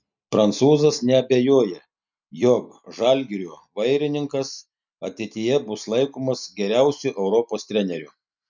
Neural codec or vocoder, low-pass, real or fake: none; 7.2 kHz; real